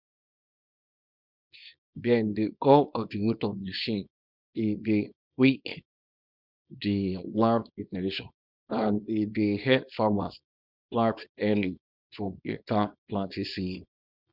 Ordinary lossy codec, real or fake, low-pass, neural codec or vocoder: none; fake; 5.4 kHz; codec, 24 kHz, 0.9 kbps, WavTokenizer, small release